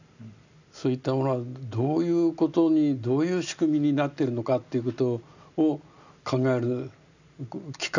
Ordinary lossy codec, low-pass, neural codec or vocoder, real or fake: none; 7.2 kHz; none; real